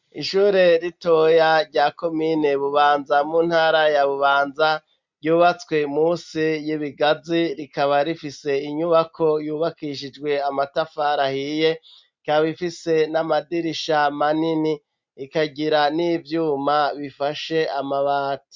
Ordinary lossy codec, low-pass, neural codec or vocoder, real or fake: MP3, 64 kbps; 7.2 kHz; none; real